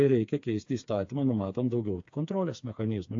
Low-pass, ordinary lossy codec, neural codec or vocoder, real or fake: 7.2 kHz; AAC, 48 kbps; codec, 16 kHz, 4 kbps, FreqCodec, smaller model; fake